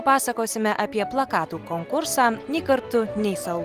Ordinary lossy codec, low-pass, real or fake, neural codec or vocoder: Opus, 24 kbps; 14.4 kHz; real; none